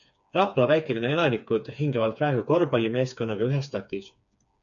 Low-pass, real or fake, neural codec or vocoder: 7.2 kHz; fake; codec, 16 kHz, 4 kbps, FreqCodec, smaller model